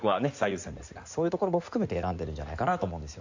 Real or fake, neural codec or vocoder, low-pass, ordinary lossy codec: fake; codec, 16 kHz in and 24 kHz out, 2.2 kbps, FireRedTTS-2 codec; 7.2 kHz; AAC, 48 kbps